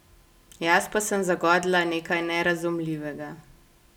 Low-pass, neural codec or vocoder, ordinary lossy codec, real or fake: 19.8 kHz; none; none; real